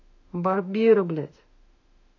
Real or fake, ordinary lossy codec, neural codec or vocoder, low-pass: fake; AAC, 48 kbps; autoencoder, 48 kHz, 32 numbers a frame, DAC-VAE, trained on Japanese speech; 7.2 kHz